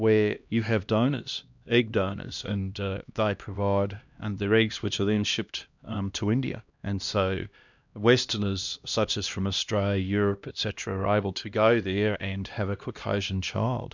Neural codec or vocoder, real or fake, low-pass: codec, 16 kHz, 1 kbps, X-Codec, HuBERT features, trained on LibriSpeech; fake; 7.2 kHz